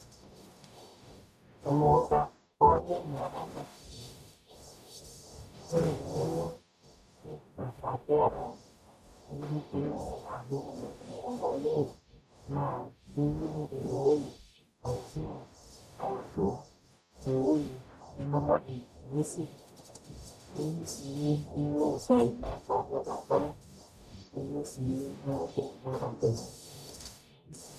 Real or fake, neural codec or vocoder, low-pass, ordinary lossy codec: fake; codec, 44.1 kHz, 0.9 kbps, DAC; 14.4 kHz; none